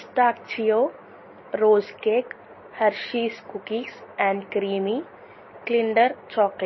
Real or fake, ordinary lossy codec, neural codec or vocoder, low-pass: real; MP3, 24 kbps; none; 7.2 kHz